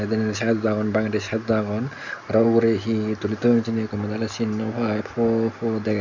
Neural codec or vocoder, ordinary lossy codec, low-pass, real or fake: none; none; 7.2 kHz; real